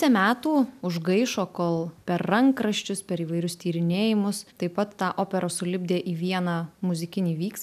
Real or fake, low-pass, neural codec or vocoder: real; 14.4 kHz; none